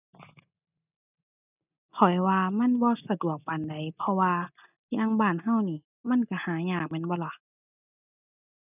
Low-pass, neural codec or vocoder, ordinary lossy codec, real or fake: 3.6 kHz; none; none; real